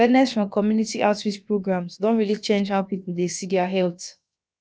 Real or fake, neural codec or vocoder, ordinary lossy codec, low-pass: fake; codec, 16 kHz, about 1 kbps, DyCAST, with the encoder's durations; none; none